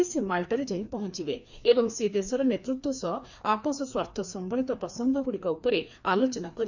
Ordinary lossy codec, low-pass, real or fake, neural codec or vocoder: none; 7.2 kHz; fake; codec, 16 kHz, 2 kbps, FreqCodec, larger model